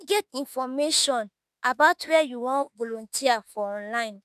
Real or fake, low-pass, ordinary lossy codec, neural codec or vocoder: fake; 14.4 kHz; none; autoencoder, 48 kHz, 32 numbers a frame, DAC-VAE, trained on Japanese speech